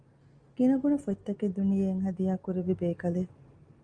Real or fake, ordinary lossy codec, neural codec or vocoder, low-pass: real; Opus, 32 kbps; none; 9.9 kHz